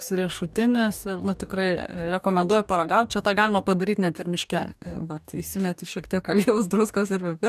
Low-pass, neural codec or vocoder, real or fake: 14.4 kHz; codec, 44.1 kHz, 2.6 kbps, DAC; fake